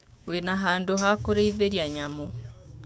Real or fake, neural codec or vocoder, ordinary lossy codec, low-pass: fake; codec, 16 kHz, 6 kbps, DAC; none; none